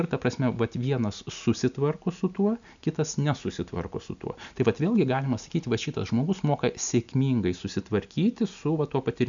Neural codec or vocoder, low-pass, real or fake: none; 7.2 kHz; real